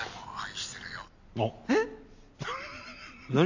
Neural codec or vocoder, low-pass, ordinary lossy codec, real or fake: none; 7.2 kHz; AAC, 48 kbps; real